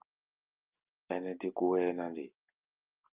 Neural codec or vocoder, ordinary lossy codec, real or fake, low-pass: none; Opus, 32 kbps; real; 3.6 kHz